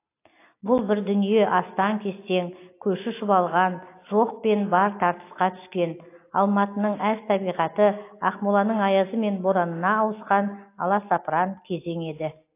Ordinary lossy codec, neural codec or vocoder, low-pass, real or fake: AAC, 24 kbps; none; 3.6 kHz; real